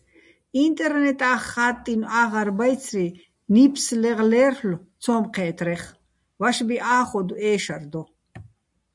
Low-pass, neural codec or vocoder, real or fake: 10.8 kHz; none; real